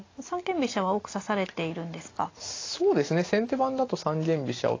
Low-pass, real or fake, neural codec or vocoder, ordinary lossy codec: 7.2 kHz; real; none; AAC, 32 kbps